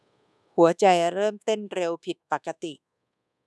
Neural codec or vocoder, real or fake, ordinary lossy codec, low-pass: codec, 24 kHz, 1.2 kbps, DualCodec; fake; none; none